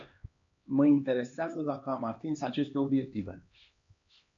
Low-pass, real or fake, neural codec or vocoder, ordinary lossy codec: 7.2 kHz; fake; codec, 16 kHz, 2 kbps, X-Codec, HuBERT features, trained on LibriSpeech; MP3, 48 kbps